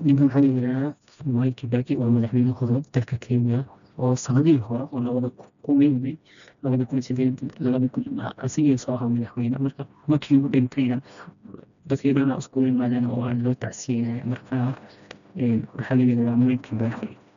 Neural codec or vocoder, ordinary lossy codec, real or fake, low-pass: codec, 16 kHz, 1 kbps, FreqCodec, smaller model; none; fake; 7.2 kHz